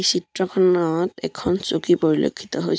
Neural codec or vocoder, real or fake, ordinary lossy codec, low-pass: none; real; none; none